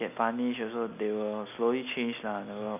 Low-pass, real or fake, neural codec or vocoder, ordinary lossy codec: 3.6 kHz; real; none; none